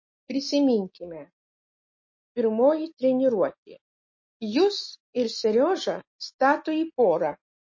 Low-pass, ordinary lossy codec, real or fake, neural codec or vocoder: 7.2 kHz; MP3, 32 kbps; real; none